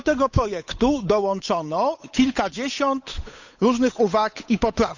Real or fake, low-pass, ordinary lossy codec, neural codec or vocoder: fake; 7.2 kHz; none; codec, 16 kHz, 8 kbps, FunCodec, trained on Chinese and English, 25 frames a second